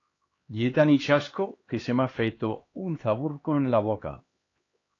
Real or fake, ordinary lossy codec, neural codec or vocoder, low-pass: fake; AAC, 32 kbps; codec, 16 kHz, 2 kbps, X-Codec, HuBERT features, trained on LibriSpeech; 7.2 kHz